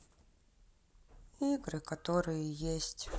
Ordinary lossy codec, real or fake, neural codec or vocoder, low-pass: none; real; none; none